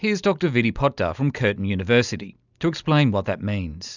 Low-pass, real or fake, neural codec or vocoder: 7.2 kHz; real; none